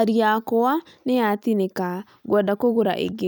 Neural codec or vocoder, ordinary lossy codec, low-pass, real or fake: none; none; none; real